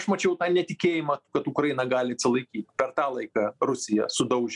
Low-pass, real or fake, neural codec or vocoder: 10.8 kHz; real; none